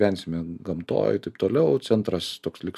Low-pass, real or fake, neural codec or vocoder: 14.4 kHz; real; none